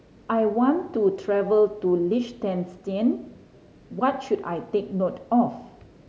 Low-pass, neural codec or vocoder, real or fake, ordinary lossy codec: none; none; real; none